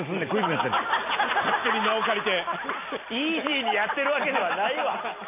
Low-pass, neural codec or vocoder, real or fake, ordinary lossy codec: 3.6 kHz; none; real; MP3, 24 kbps